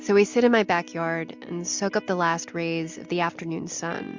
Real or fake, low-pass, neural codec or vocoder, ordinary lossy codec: real; 7.2 kHz; none; MP3, 64 kbps